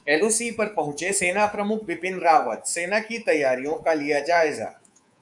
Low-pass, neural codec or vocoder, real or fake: 10.8 kHz; codec, 24 kHz, 3.1 kbps, DualCodec; fake